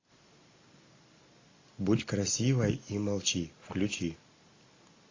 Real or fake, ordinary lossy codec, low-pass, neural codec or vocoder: real; AAC, 32 kbps; 7.2 kHz; none